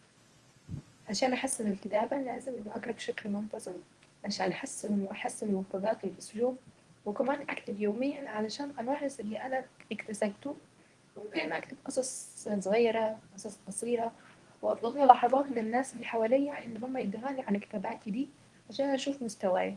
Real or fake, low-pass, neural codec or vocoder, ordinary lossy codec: fake; 10.8 kHz; codec, 24 kHz, 0.9 kbps, WavTokenizer, medium speech release version 1; Opus, 24 kbps